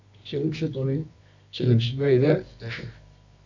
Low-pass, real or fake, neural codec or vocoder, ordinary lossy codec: 7.2 kHz; fake; codec, 24 kHz, 0.9 kbps, WavTokenizer, medium music audio release; MP3, 64 kbps